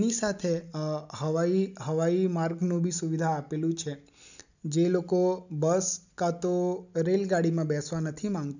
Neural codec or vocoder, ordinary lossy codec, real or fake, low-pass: none; none; real; 7.2 kHz